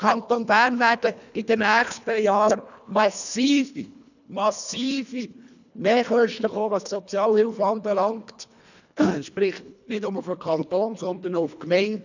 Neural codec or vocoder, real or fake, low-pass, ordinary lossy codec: codec, 24 kHz, 1.5 kbps, HILCodec; fake; 7.2 kHz; none